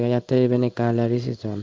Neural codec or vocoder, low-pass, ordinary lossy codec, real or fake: codec, 16 kHz in and 24 kHz out, 1 kbps, XY-Tokenizer; 7.2 kHz; Opus, 24 kbps; fake